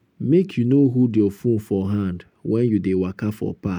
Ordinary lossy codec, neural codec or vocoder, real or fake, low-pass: MP3, 96 kbps; none; real; 19.8 kHz